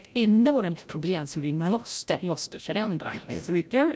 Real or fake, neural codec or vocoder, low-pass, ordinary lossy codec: fake; codec, 16 kHz, 0.5 kbps, FreqCodec, larger model; none; none